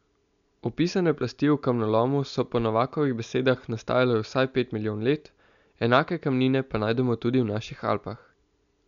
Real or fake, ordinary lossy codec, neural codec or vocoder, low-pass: real; none; none; 7.2 kHz